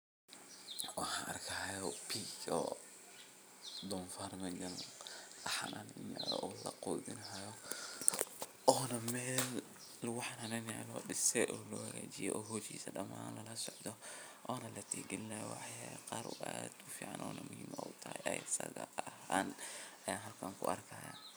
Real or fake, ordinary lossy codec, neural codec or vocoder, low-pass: fake; none; vocoder, 44.1 kHz, 128 mel bands every 256 samples, BigVGAN v2; none